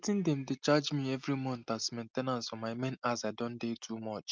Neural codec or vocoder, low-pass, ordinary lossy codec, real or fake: none; 7.2 kHz; Opus, 24 kbps; real